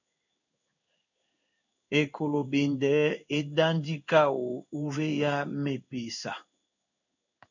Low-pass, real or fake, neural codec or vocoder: 7.2 kHz; fake; codec, 16 kHz in and 24 kHz out, 1 kbps, XY-Tokenizer